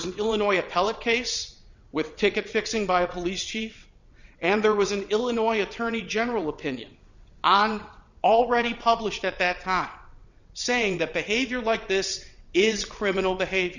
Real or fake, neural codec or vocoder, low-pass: fake; vocoder, 22.05 kHz, 80 mel bands, WaveNeXt; 7.2 kHz